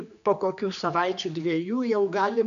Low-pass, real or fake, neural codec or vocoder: 7.2 kHz; fake; codec, 16 kHz, 2 kbps, X-Codec, HuBERT features, trained on general audio